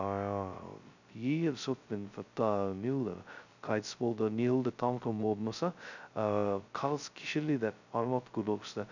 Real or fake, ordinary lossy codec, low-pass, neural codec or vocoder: fake; none; 7.2 kHz; codec, 16 kHz, 0.2 kbps, FocalCodec